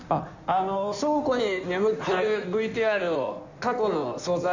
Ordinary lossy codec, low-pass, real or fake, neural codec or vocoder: none; 7.2 kHz; fake; codec, 16 kHz in and 24 kHz out, 1.1 kbps, FireRedTTS-2 codec